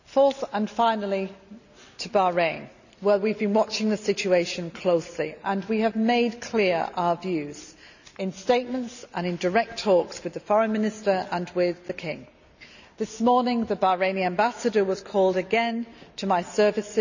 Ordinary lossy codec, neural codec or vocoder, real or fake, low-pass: none; none; real; 7.2 kHz